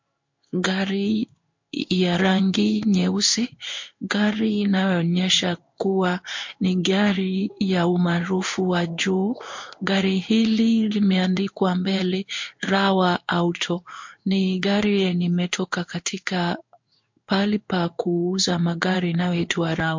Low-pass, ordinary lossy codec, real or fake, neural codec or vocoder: 7.2 kHz; MP3, 48 kbps; fake; codec, 16 kHz in and 24 kHz out, 1 kbps, XY-Tokenizer